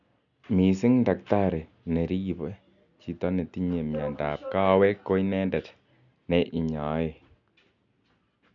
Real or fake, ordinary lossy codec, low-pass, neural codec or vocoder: real; none; 7.2 kHz; none